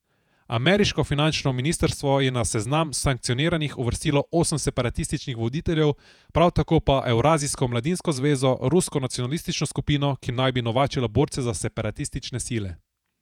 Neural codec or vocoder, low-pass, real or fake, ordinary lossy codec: vocoder, 48 kHz, 128 mel bands, Vocos; 19.8 kHz; fake; none